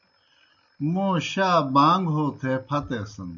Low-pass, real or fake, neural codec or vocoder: 7.2 kHz; real; none